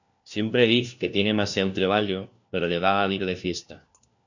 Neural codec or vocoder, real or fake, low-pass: codec, 16 kHz, 1 kbps, FunCodec, trained on LibriTTS, 50 frames a second; fake; 7.2 kHz